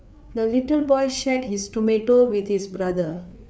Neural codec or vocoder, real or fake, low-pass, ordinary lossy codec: codec, 16 kHz, 4 kbps, FreqCodec, larger model; fake; none; none